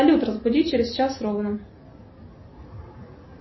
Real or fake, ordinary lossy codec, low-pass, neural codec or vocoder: real; MP3, 24 kbps; 7.2 kHz; none